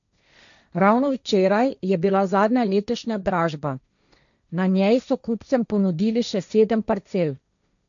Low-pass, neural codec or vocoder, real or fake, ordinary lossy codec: 7.2 kHz; codec, 16 kHz, 1.1 kbps, Voila-Tokenizer; fake; none